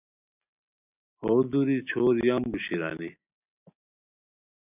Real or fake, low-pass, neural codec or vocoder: real; 3.6 kHz; none